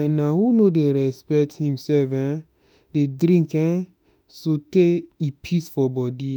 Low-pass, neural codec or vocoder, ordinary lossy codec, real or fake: none; autoencoder, 48 kHz, 32 numbers a frame, DAC-VAE, trained on Japanese speech; none; fake